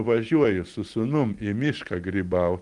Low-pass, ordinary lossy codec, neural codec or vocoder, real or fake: 10.8 kHz; Opus, 24 kbps; none; real